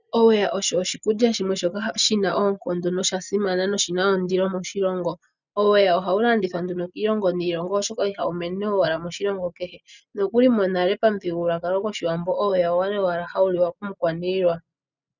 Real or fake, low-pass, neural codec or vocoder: real; 7.2 kHz; none